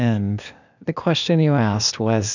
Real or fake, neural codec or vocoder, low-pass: fake; codec, 16 kHz, 0.8 kbps, ZipCodec; 7.2 kHz